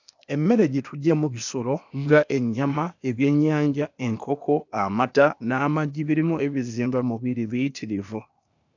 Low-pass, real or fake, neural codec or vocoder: 7.2 kHz; fake; codec, 16 kHz, 0.8 kbps, ZipCodec